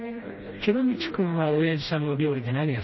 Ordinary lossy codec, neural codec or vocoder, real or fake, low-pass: MP3, 24 kbps; codec, 16 kHz, 1 kbps, FreqCodec, smaller model; fake; 7.2 kHz